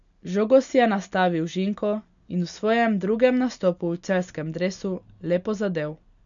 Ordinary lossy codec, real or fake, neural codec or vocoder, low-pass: none; real; none; 7.2 kHz